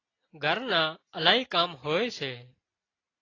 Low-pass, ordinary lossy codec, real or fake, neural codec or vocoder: 7.2 kHz; AAC, 32 kbps; real; none